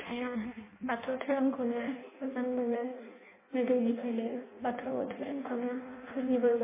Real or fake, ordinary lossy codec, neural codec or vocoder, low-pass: fake; MP3, 16 kbps; codec, 16 kHz in and 24 kHz out, 0.6 kbps, FireRedTTS-2 codec; 3.6 kHz